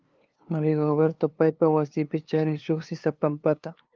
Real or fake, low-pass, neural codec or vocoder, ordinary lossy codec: fake; 7.2 kHz; codec, 16 kHz, 2 kbps, FunCodec, trained on LibriTTS, 25 frames a second; Opus, 32 kbps